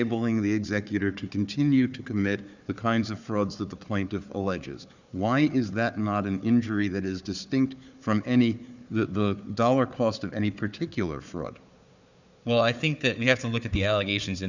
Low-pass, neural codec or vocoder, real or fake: 7.2 kHz; codec, 16 kHz, 4 kbps, FunCodec, trained on Chinese and English, 50 frames a second; fake